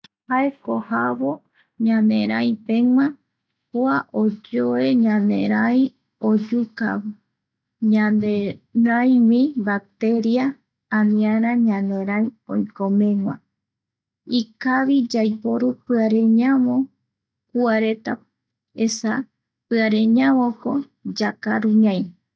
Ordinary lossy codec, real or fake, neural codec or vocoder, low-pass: none; real; none; none